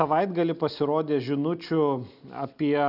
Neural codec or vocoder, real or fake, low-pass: none; real; 5.4 kHz